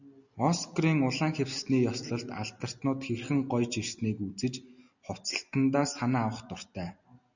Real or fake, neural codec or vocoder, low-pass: real; none; 7.2 kHz